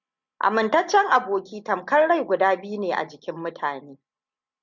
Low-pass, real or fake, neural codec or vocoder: 7.2 kHz; real; none